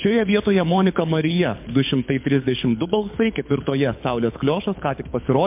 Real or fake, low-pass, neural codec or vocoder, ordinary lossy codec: fake; 3.6 kHz; codec, 24 kHz, 6 kbps, HILCodec; MP3, 32 kbps